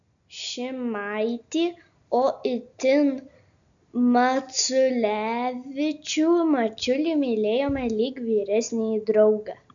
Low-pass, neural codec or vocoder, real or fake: 7.2 kHz; none; real